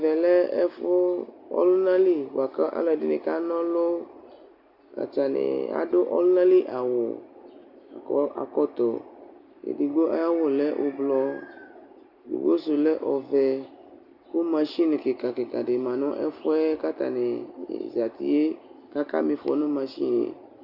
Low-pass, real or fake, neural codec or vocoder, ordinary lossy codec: 5.4 kHz; real; none; Opus, 64 kbps